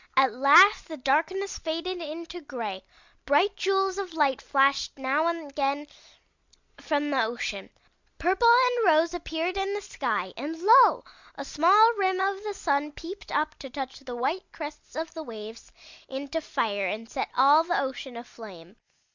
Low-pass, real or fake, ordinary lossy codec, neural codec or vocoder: 7.2 kHz; real; Opus, 64 kbps; none